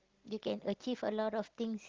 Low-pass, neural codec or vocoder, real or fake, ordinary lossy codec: 7.2 kHz; none; real; Opus, 16 kbps